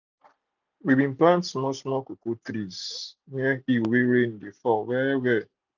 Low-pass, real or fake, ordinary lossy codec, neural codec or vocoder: 7.2 kHz; real; none; none